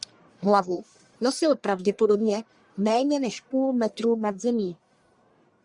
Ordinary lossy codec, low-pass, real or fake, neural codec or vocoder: Opus, 64 kbps; 10.8 kHz; fake; codec, 44.1 kHz, 1.7 kbps, Pupu-Codec